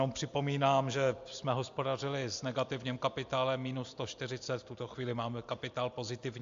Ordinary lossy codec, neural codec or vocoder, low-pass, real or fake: AAC, 64 kbps; none; 7.2 kHz; real